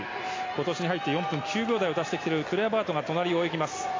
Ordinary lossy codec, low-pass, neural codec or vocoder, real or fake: AAC, 32 kbps; 7.2 kHz; none; real